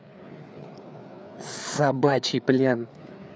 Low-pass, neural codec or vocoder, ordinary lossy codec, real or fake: none; codec, 16 kHz, 4 kbps, FreqCodec, larger model; none; fake